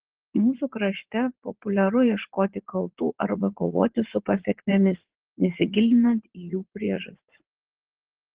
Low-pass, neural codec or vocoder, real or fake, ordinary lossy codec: 3.6 kHz; vocoder, 44.1 kHz, 80 mel bands, Vocos; fake; Opus, 16 kbps